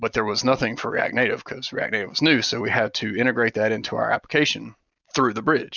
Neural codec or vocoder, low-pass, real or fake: none; 7.2 kHz; real